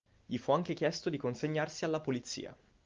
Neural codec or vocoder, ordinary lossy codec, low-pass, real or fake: none; Opus, 24 kbps; 7.2 kHz; real